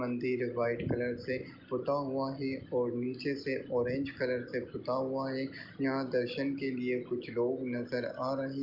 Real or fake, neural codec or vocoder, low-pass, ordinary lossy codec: real; none; 5.4 kHz; Opus, 24 kbps